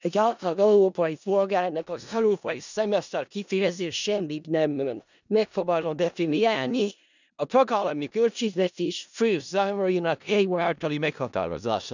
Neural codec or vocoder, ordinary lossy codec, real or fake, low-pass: codec, 16 kHz in and 24 kHz out, 0.4 kbps, LongCat-Audio-Codec, four codebook decoder; none; fake; 7.2 kHz